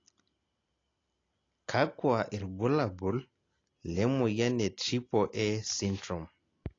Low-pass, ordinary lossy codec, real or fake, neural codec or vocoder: 7.2 kHz; AAC, 32 kbps; real; none